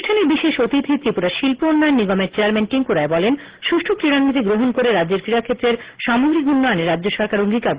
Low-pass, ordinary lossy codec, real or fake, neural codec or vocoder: 3.6 kHz; Opus, 16 kbps; real; none